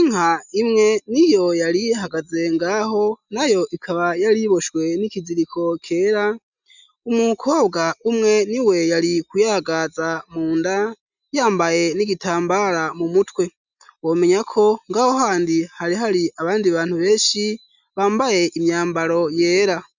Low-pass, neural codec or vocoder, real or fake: 7.2 kHz; none; real